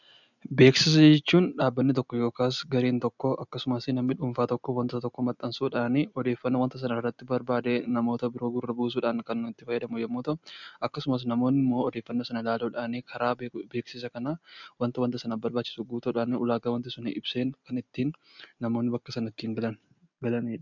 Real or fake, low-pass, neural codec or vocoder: real; 7.2 kHz; none